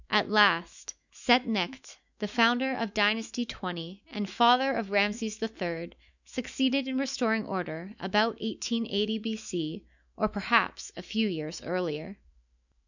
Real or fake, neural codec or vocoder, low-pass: fake; autoencoder, 48 kHz, 128 numbers a frame, DAC-VAE, trained on Japanese speech; 7.2 kHz